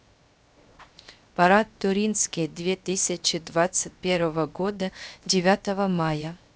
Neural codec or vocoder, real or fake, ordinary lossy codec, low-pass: codec, 16 kHz, 0.7 kbps, FocalCodec; fake; none; none